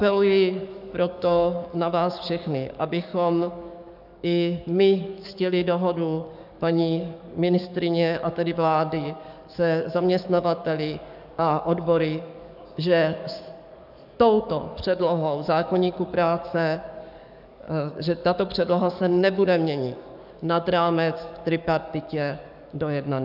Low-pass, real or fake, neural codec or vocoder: 5.4 kHz; fake; codec, 44.1 kHz, 7.8 kbps, DAC